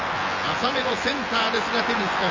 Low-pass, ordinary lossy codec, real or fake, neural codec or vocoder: 7.2 kHz; Opus, 32 kbps; fake; vocoder, 24 kHz, 100 mel bands, Vocos